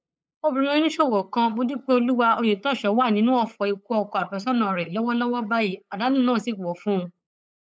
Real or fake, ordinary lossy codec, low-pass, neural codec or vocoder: fake; none; none; codec, 16 kHz, 8 kbps, FunCodec, trained on LibriTTS, 25 frames a second